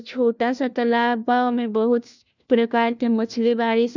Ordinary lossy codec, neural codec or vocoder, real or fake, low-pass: none; codec, 16 kHz, 1 kbps, FunCodec, trained on LibriTTS, 50 frames a second; fake; 7.2 kHz